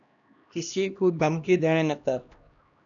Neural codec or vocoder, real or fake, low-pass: codec, 16 kHz, 1 kbps, X-Codec, HuBERT features, trained on LibriSpeech; fake; 7.2 kHz